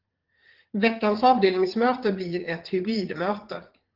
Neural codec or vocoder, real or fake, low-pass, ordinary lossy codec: vocoder, 44.1 kHz, 80 mel bands, Vocos; fake; 5.4 kHz; Opus, 16 kbps